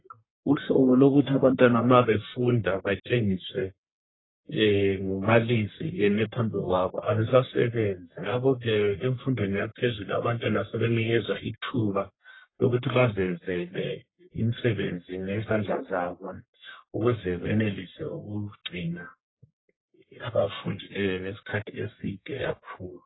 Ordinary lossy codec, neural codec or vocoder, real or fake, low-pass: AAC, 16 kbps; codec, 44.1 kHz, 1.7 kbps, Pupu-Codec; fake; 7.2 kHz